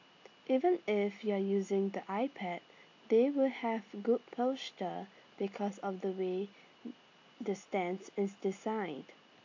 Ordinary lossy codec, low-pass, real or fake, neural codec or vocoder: AAC, 48 kbps; 7.2 kHz; real; none